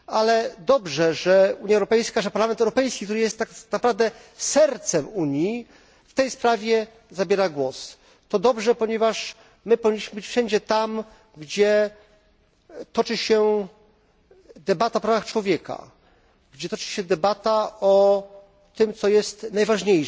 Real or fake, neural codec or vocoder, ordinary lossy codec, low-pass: real; none; none; none